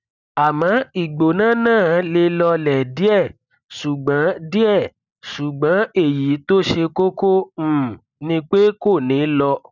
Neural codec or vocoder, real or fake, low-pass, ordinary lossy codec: none; real; 7.2 kHz; none